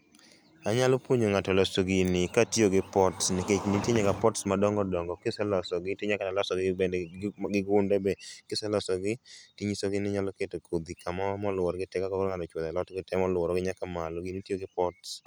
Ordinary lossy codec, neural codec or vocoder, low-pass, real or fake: none; none; none; real